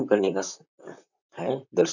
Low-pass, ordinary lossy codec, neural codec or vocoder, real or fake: 7.2 kHz; none; vocoder, 44.1 kHz, 128 mel bands, Pupu-Vocoder; fake